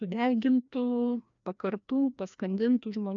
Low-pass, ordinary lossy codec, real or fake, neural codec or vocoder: 7.2 kHz; MP3, 96 kbps; fake; codec, 16 kHz, 1 kbps, FreqCodec, larger model